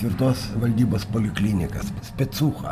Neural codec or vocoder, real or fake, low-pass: none; real; 14.4 kHz